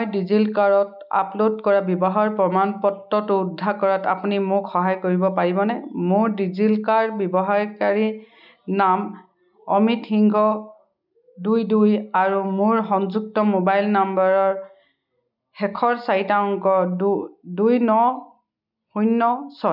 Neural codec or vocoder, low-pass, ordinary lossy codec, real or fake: none; 5.4 kHz; none; real